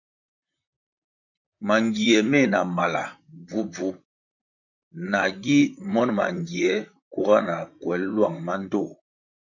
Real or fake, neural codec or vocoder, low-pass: fake; vocoder, 44.1 kHz, 128 mel bands, Pupu-Vocoder; 7.2 kHz